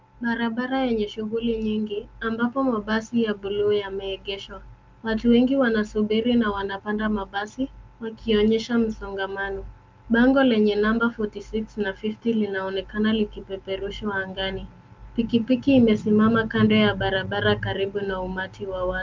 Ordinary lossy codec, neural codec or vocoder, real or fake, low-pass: Opus, 24 kbps; none; real; 7.2 kHz